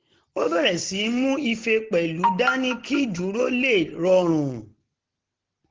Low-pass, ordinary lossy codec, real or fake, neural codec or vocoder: 7.2 kHz; Opus, 16 kbps; real; none